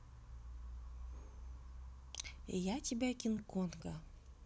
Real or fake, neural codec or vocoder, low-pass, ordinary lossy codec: real; none; none; none